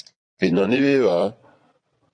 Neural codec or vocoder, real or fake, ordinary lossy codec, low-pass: vocoder, 22.05 kHz, 80 mel bands, Vocos; fake; AAC, 48 kbps; 9.9 kHz